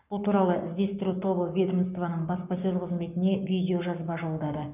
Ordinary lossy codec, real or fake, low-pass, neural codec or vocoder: none; fake; 3.6 kHz; codec, 44.1 kHz, 7.8 kbps, Pupu-Codec